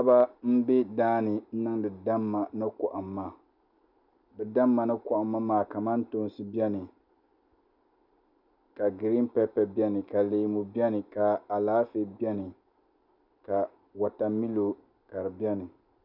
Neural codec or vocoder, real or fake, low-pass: none; real; 5.4 kHz